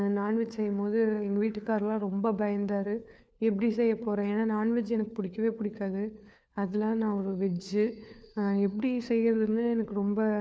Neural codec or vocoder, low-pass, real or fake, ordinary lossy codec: codec, 16 kHz, 4 kbps, FunCodec, trained on LibriTTS, 50 frames a second; none; fake; none